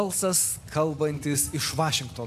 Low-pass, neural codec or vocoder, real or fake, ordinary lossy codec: 14.4 kHz; none; real; AAC, 64 kbps